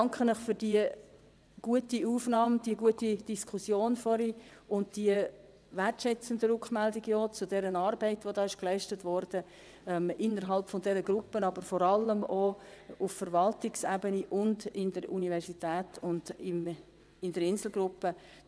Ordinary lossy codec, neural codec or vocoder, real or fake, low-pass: none; vocoder, 22.05 kHz, 80 mel bands, WaveNeXt; fake; none